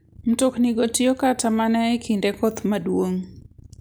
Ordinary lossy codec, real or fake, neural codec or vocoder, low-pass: none; real; none; none